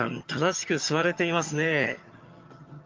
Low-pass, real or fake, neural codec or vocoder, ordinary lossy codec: 7.2 kHz; fake; vocoder, 22.05 kHz, 80 mel bands, HiFi-GAN; Opus, 24 kbps